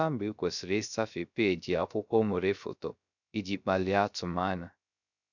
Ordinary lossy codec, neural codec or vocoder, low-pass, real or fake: none; codec, 16 kHz, 0.3 kbps, FocalCodec; 7.2 kHz; fake